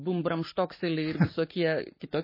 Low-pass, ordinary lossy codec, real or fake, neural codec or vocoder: 5.4 kHz; MP3, 24 kbps; fake; codec, 44.1 kHz, 7.8 kbps, Pupu-Codec